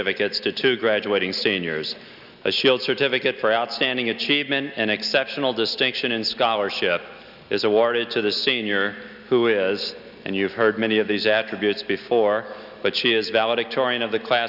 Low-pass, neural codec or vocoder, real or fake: 5.4 kHz; none; real